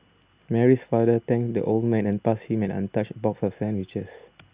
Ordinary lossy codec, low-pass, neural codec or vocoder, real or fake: Opus, 64 kbps; 3.6 kHz; none; real